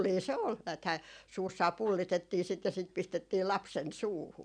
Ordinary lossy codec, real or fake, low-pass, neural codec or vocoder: none; real; 9.9 kHz; none